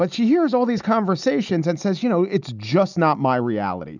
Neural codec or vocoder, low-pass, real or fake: none; 7.2 kHz; real